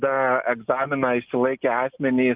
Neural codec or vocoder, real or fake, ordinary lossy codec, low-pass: none; real; Opus, 24 kbps; 3.6 kHz